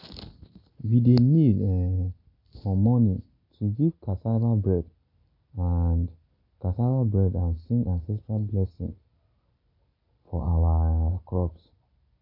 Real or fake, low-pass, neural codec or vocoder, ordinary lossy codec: real; 5.4 kHz; none; AAC, 32 kbps